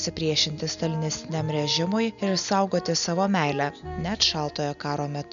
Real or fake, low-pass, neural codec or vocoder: real; 7.2 kHz; none